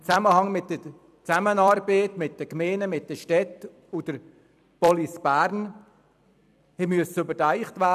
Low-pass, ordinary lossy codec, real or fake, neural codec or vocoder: 14.4 kHz; none; fake; vocoder, 44.1 kHz, 128 mel bands every 256 samples, BigVGAN v2